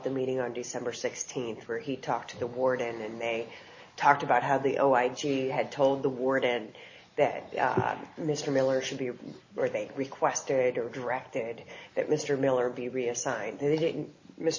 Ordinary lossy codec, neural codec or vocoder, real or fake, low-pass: MP3, 32 kbps; none; real; 7.2 kHz